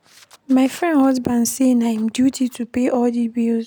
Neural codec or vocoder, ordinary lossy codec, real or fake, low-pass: none; none; real; none